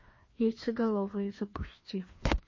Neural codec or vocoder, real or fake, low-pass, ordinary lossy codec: codec, 16 kHz, 2 kbps, FreqCodec, larger model; fake; 7.2 kHz; MP3, 32 kbps